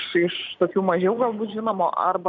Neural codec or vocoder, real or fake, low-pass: none; real; 7.2 kHz